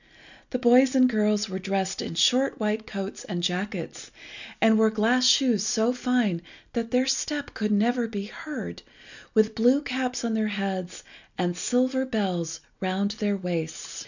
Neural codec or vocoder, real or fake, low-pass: none; real; 7.2 kHz